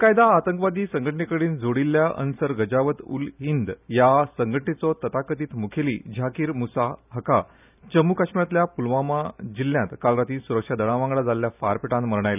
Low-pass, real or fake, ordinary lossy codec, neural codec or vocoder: 3.6 kHz; real; none; none